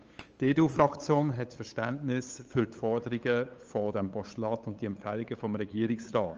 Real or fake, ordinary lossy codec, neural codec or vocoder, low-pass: fake; Opus, 24 kbps; codec, 16 kHz, 8 kbps, FunCodec, trained on LibriTTS, 25 frames a second; 7.2 kHz